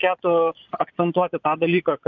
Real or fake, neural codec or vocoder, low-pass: fake; vocoder, 22.05 kHz, 80 mel bands, Vocos; 7.2 kHz